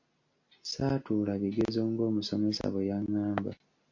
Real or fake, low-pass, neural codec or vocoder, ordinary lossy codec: real; 7.2 kHz; none; MP3, 48 kbps